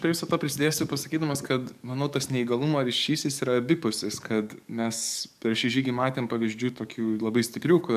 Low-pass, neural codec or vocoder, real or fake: 14.4 kHz; codec, 44.1 kHz, 7.8 kbps, DAC; fake